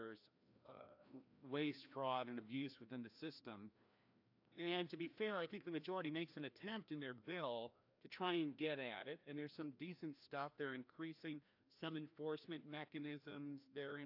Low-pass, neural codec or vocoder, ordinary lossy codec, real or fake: 5.4 kHz; codec, 16 kHz, 1 kbps, FreqCodec, larger model; AAC, 48 kbps; fake